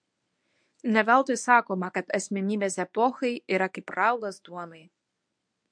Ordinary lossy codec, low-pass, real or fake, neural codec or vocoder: MP3, 64 kbps; 9.9 kHz; fake; codec, 24 kHz, 0.9 kbps, WavTokenizer, medium speech release version 1